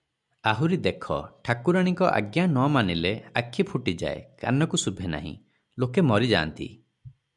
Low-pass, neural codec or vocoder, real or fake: 10.8 kHz; none; real